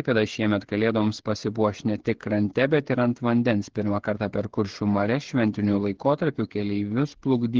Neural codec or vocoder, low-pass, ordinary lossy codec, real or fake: codec, 16 kHz, 8 kbps, FreqCodec, smaller model; 7.2 kHz; Opus, 16 kbps; fake